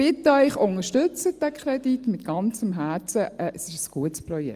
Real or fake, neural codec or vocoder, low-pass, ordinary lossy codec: real; none; 14.4 kHz; Opus, 32 kbps